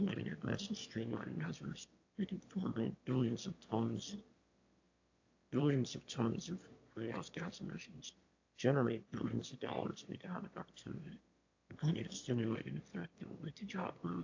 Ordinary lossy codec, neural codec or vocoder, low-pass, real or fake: AAC, 48 kbps; autoencoder, 22.05 kHz, a latent of 192 numbers a frame, VITS, trained on one speaker; 7.2 kHz; fake